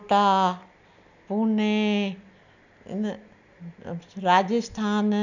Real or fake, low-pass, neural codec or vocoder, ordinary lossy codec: real; 7.2 kHz; none; none